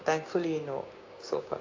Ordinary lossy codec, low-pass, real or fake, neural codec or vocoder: AAC, 32 kbps; 7.2 kHz; fake; codec, 16 kHz, 6 kbps, DAC